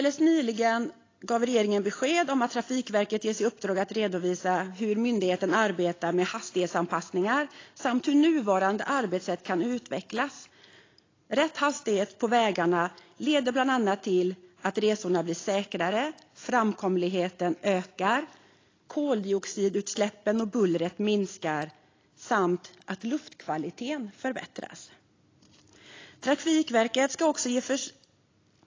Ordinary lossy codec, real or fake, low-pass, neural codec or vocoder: AAC, 32 kbps; real; 7.2 kHz; none